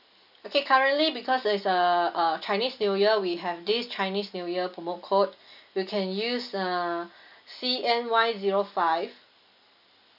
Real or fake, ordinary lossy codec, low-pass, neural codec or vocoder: real; AAC, 48 kbps; 5.4 kHz; none